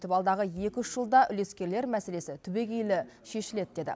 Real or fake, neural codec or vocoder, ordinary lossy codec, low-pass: real; none; none; none